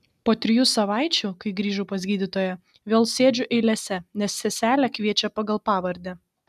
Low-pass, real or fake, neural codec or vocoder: 14.4 kHz; real; none